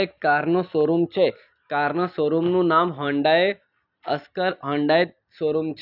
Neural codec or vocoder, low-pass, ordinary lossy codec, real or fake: none; 5.4 kHz; none; real